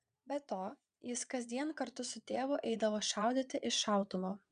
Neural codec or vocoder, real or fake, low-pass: vocoder, 22.05 kHz, 80 mel bands, Vocos; fake; 9.9 kHz